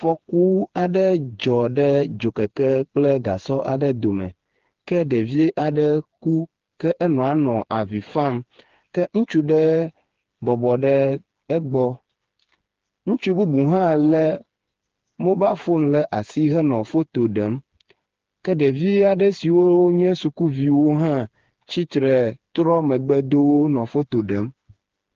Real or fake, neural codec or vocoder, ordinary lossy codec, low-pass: fake; codec, 16 kHz, 4 kbps, FreqCodec, smaller model; Opus, 16 kbps; 7.2 kHz